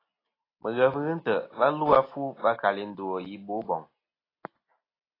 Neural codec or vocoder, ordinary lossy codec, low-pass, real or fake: none; AAC, 24 kbps; 5.4 kHz; real